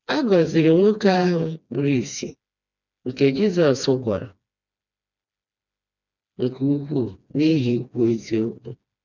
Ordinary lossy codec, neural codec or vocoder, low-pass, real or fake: none; codec, 16 kHz, 2 kbps, FreqCodec, smaller model; 7.2 kHz; fake